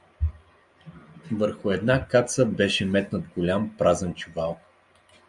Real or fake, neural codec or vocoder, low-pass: real; none; 10.8 kHz